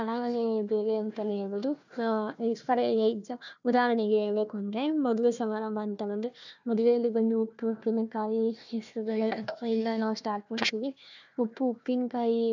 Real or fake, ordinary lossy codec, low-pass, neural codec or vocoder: fake; none; 7.2 kHz; codec, 16 kHz, 1 kbps, FunCodec, trained on Chinese and English, 50 frames a second